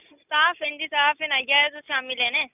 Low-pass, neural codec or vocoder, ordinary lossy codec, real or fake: 3.6 kHz; none; none; real